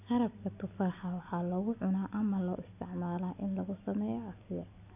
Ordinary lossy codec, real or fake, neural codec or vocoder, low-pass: AAC, 32 kbps; real; none; 3.6 kHz